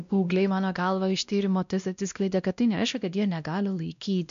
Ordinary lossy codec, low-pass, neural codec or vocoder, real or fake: MP3, 64 kbps; 7.2 kHz; codec, 16 kHz, 1 kbps, X-Codec, WavLM features, trained on Multilingual LibriSpeech; fake